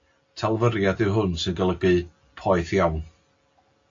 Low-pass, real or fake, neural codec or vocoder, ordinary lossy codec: 7.2 kHz; real; none; AAC, 48 kbps